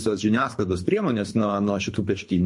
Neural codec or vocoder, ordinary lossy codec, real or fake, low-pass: codec, 24 kHz, 3 kbps, HILCodec; MP3, 48 kbps; fake; 10.8 kHz